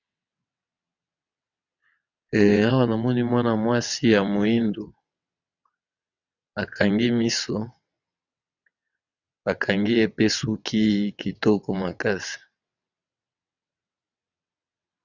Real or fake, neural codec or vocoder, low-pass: fake; vocoder, 22.05 kHz, 80 mel bands, WaveNeXt; 7.2 kHz